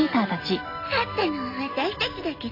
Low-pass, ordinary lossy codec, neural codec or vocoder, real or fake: 5.4 kHz; AAC, 24 kbps; none; real